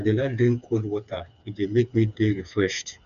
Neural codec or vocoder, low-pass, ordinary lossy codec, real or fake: codec, 16 kHz, 4 kbps, FreqCodec, smaller model; 7.2 kHz; none; fake